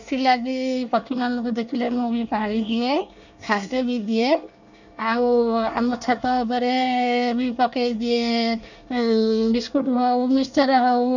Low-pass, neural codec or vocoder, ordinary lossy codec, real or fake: 7.2 kHz; codec, 24 kHz, 1 kbps, SNAC; none; fake